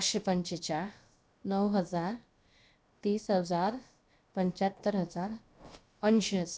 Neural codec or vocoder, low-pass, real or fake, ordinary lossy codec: codec, 16 kHz, about 1 kbps, DyCAST, with the encoder's durations; none; fake; none